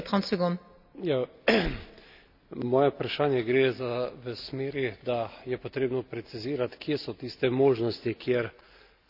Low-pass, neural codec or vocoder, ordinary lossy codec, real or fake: 5.4 kHz; none; none; real